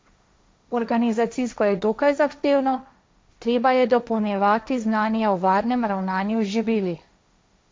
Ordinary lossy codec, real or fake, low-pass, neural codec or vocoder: none; fake; none; codec, 16 kHz, 1.1 kbps, Voila-Tokenizer